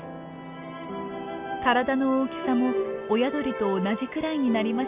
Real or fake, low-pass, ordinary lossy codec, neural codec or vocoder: real; 3.6 kHz; Opus, 64 kbps; none